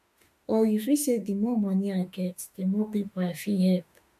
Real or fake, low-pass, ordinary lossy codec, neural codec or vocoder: fake; 14.4 kHz; MP3, 64 kbps; autoencoder, 48 kHz, 32 numbers a frame, DAC-VAE, trained on Japanese speech